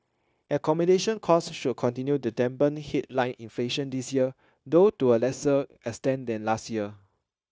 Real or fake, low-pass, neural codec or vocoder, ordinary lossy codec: fake; none; codec, 16 kHz, 0.9 kbps, LongCat-Audio-Codec; none